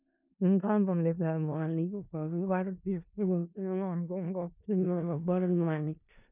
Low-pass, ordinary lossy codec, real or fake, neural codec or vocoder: 3.6 kHz; none; fake; codec, 16 kHz in and 24 kHz out, 0.4 kbps, LongCat-Audio-Codec, four codebook decoder